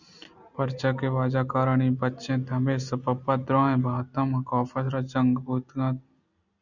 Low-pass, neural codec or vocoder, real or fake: 7.2 kHz; none; real